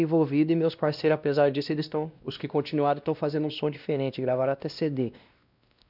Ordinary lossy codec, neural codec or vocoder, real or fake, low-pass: none; codec, 16 kHz, 1 kbps, X-Codec, WavLM features, trained on Multilingual LibriSpeech; fake; 5.4 kHz